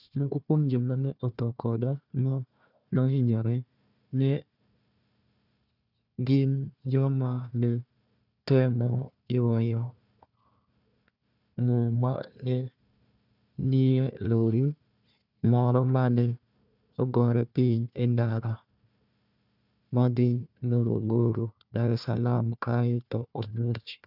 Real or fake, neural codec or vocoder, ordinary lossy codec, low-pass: fake; codec, 16 kHz, 1 kbps, FunCodec, trained on Chinese and English, 50 frames a second; none; 5.4 kHz